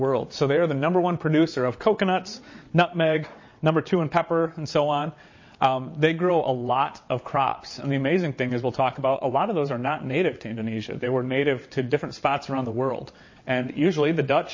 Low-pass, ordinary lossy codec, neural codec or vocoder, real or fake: 7.2 kHz; MP3, 32 kbps; vocoder, 22.05 kHz, 80 mel bands, WaveNeXt; fake